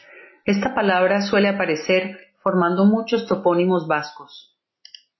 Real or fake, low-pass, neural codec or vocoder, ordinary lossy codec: real; 7.2 kHz; none; MP3, 24 kbps